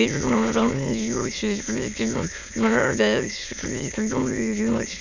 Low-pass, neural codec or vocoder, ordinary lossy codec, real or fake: 7.2 kHz; autoencoder, 22.05 kHz, a latent of 192 numbers a frame, VITS, trained on many speakers; none; fake